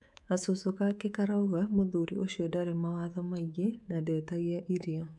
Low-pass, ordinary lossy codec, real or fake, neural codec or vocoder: 10.8 kHz; none; fake; codec, 24 kHz, 3.1 kbps, DualCodec